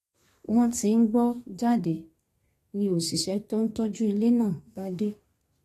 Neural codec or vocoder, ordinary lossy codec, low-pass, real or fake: codec, 32 kHz, 1.9 kbps, SNAC; AAC, 48 kbps; 14.4 kHz; fake